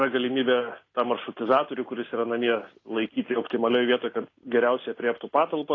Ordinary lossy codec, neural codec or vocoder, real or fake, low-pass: AAC, 32 kbps; none; real; 7.2 kHz